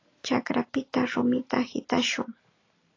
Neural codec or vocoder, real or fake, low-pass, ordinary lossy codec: none; real; 7.2 kHz; AAC, 32 kbps